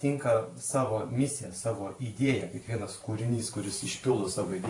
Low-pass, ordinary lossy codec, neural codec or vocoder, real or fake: 10.8 kHz; AAC, 48 kbps; vocoder, 44.1 kHz, 128 mel bands every 512 samples, BigVGAN v2; fake